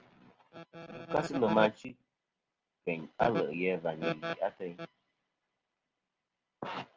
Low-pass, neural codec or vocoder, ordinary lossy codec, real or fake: 7.2 kHz; none; Opus, 24 kbps; real